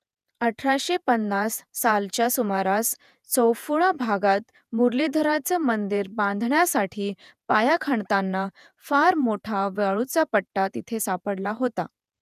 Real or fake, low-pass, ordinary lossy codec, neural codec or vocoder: fake; 14.4 kHz; none; vocoder, 48 kHz, 128 mel bands, Vocos